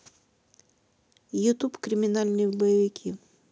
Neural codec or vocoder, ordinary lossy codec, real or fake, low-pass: none; none; real; none